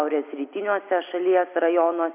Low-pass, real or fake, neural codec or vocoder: 3.6 kHz; real; none